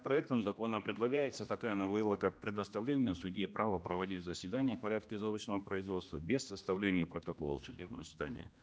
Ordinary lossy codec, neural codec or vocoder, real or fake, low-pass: none; codec, 16 kHz, 1 kbps, X-Codec, HuBERT features, trained on general audio; fake; none